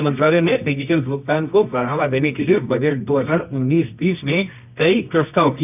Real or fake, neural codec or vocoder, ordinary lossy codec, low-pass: fake; codec, 24 kHz, 0.9 kbps, WavTokenizer, medium music audio release; none; 3.6 kHz